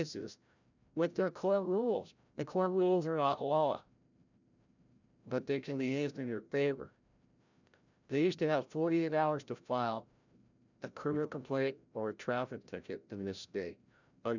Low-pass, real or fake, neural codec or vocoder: 7.2 kHz; fake; codec, 16 kHz, 0.5 kbps, FreqCodec, larger model